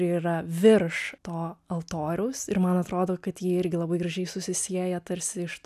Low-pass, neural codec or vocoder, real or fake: 14.4 kHz; none; real